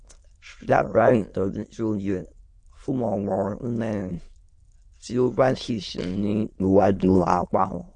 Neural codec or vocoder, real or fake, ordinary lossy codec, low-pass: autoencoder, 22.05 kHz, a latent of 192 numbers a frame, VITS, trained on many speakers; fake; MP3, 48 kbps; 9.9 kHz